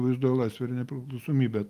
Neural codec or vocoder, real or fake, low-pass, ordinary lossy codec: none; real; 14.4 kHz; Opus, 32 kbps